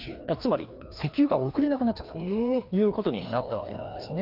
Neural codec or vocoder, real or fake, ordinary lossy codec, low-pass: codec, 16 kHz, 2 kbps, FreqCodec, larger model; fake; Opus, 24 kbps; 5.4 kHz